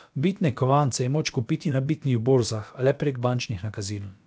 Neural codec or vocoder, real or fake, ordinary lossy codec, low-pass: codec, 16 kHz, about 1 kbps, DyCAST, with the encoder's durations; fake; none; none